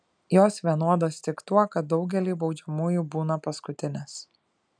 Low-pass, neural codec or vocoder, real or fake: 9.9 kHz; none; real